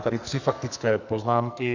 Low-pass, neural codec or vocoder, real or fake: 7.2 kHz; codec, 44.1 kHz, 2.6 kbps, SNAC; fake